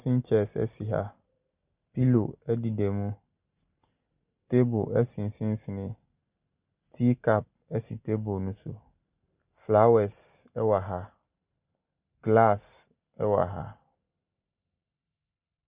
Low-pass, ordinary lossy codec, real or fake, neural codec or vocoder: 3.6 kHz; Opus, 64 kbps; real; none